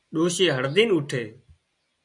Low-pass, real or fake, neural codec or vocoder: 10.8 kHz; real; none